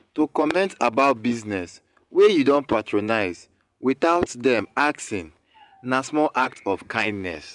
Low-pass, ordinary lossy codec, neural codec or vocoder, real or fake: 10.8 kHz; none; vocoder, 24 kHz, 100 mel bands, Vocos; fake